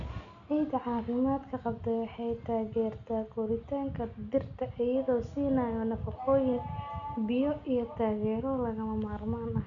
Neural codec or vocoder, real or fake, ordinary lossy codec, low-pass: none; real; none; 7.2 kHz